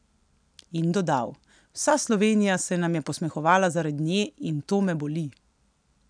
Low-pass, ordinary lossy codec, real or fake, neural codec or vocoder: 9.9 kHz; none; real; none